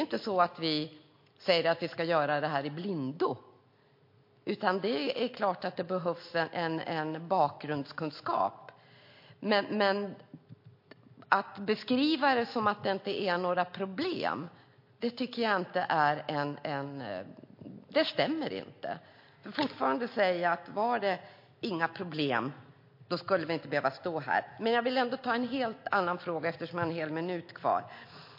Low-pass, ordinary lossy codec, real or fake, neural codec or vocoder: 5.4 kHz; MP3, 32 kbps; real; none